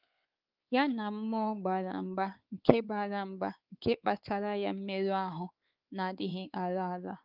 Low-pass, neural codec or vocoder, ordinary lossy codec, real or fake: 5.4 kHz; codec, 16 kHz, 4 kbps, X-Codec, WavLM features, trained on Multilingual LibriSpeech; Opus, 24 kbps; fake